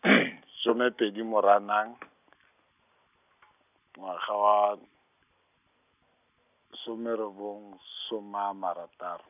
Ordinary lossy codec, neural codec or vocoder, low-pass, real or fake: none; none; 3.6 kHz; real